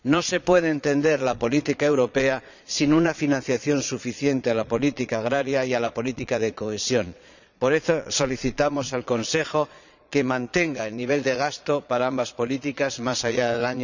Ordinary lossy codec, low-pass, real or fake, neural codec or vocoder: none; 7.2 kHz; fake; vocoder, 22.05 kHz, 80 mel bands, Vocos